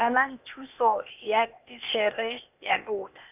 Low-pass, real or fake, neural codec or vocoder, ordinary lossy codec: 3.6 kHz; fake; codec, 16 kHz, 0.8 kbps, ZipCodec; none